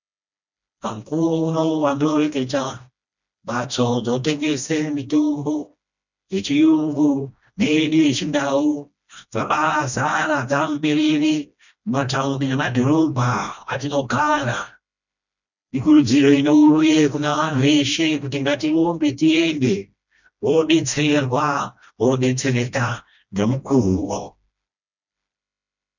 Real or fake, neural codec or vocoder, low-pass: fake; codec, 16 kHz, 1 kbps, FreqCodec, smaller model; 7.2 kHz